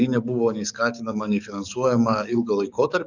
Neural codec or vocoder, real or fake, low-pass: codec, 44.1 kHz, 7.8 kbps, DAC; fake; 7.2 kHz